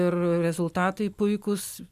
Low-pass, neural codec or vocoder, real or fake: 14.4 kHz; none; real